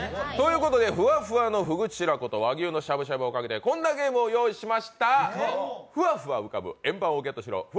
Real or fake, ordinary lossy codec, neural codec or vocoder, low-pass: real; none; none; none